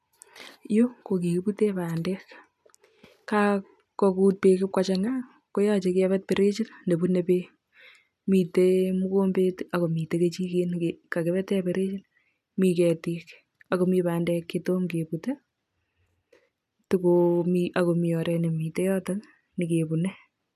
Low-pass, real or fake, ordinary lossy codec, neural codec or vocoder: 14.4 kHz; real; none; none